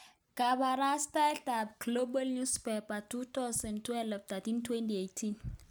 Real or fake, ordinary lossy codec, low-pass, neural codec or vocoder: real; none; none; none